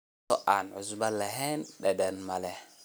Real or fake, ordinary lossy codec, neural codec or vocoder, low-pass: real; none; none; none